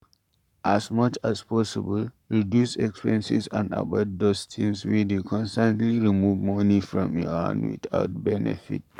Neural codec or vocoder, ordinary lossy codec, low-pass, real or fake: codec, 44.1 kHz, 7.8 kbps, Pupu-Codec; none; 19.8 kHz; fake